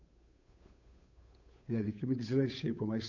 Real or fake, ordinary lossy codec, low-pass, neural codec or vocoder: fake; none; 7.2 kHz; codec, 16 kHz, 8 kbps, FunCodec, trained on Chinese and English, 25 frames a second